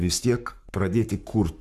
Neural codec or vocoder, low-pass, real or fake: codec, 44.1 kHz, 7.8 kbps, Pupu-Codec; 14.4 kHz; fake